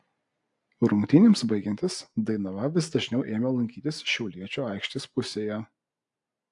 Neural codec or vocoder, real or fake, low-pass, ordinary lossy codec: none; real; 10.8 kHz; AAC, 64 kbps